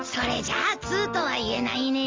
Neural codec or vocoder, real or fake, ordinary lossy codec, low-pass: none; real; Opus, 32 kbps; 7.2 kHz